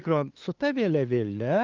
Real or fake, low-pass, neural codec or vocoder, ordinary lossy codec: fake; 7.2 kHz; codec, 16 kHz, 2 kbps, FunCodec, trained on LibriTTS, 25 frames a second; Opus, 24 kbps